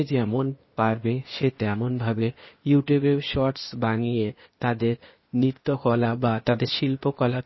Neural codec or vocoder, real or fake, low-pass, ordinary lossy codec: codec, 16 kHz, 0.8 kbps, ZipCodec; fake; 7.2 kHz; MP3, 24 kbps